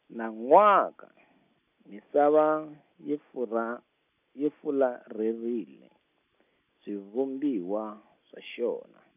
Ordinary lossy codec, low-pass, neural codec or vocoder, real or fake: none; 3.6 kHz; none; real